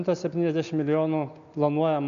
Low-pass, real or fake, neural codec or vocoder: 7.2 kHz; real; none